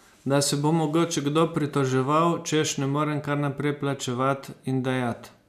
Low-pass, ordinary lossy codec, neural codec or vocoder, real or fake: 14.4 kHz; none; none; real